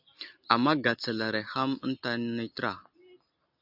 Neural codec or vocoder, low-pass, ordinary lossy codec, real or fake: none; 5.4 kHz; AAC, 48 kbps; real